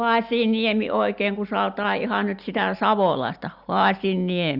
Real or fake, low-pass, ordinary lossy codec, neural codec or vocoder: real; 5.4 kHz; Opus, 64 kbps; none